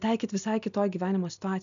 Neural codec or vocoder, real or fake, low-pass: none; real; 7.2 kHz